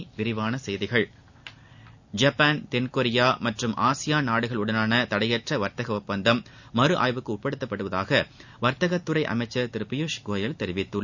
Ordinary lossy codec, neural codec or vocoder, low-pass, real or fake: none; none; 7.2 kHz; real